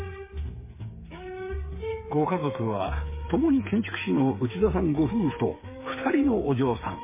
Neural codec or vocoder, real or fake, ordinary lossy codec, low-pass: codec, 16 kHz, 8 kbps, FreqCodec, smaller model; fake; MP3, 16 kbps; 3.6 kHz